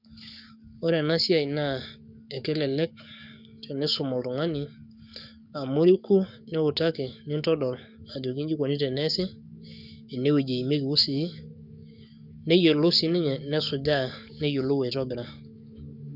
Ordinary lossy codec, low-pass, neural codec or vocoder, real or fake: none; 5.4 kHz; codec, 44.1 kHz, 7.8 kbps, DAC; fake